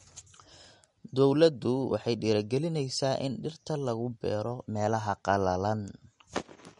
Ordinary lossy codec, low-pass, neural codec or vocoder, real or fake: MP3, 48 kbps; 14.4 kHz; none; real